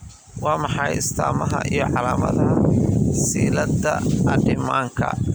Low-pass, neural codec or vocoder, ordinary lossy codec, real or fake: none; vocoder, 44.1 kHz, 128 mel bands every 256 samples, BigVGAN v2; none; fake